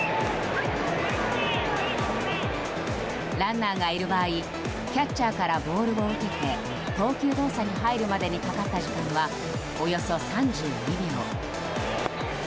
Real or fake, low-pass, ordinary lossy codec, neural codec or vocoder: real; none; none; none